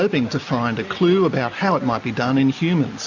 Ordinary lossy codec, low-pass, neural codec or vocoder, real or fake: AAC, 48 kbps; 7.2 kHz; none; real